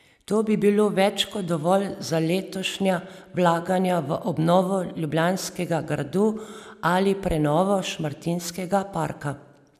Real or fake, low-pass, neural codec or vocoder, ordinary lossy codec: real; 14.4 kHz; none; none